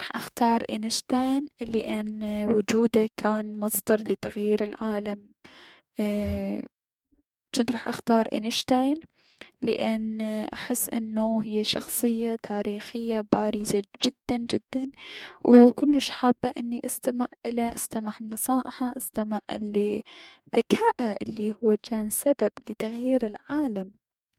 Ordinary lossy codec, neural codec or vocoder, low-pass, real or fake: MP3, 96 kbps; codec, 44.1 kHz, 2.6 kbps, DAC; 19.8 kHz; fake